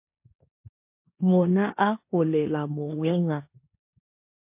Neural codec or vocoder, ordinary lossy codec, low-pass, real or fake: codec, 16 kHz in and 24 kHz out, 0.9 kbps, LongCat-Audio-Codec, four codebook decoder; MP3, 24 kbps; 3.6 kHz; fake